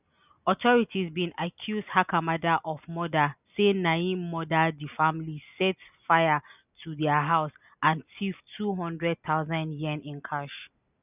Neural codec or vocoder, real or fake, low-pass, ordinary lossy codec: none; real; 3.6 kHz; none